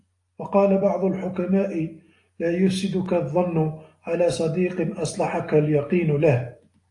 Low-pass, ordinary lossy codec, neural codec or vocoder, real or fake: 10.8 kHz; AAC, 48 kbps; none; real